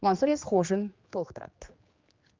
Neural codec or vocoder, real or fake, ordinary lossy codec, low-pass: codec, 16 kHz, 2 kbps, X-Codec, HuBERT features, trained on general audio; fake; Opus, 24 kbps; 7.2 kHz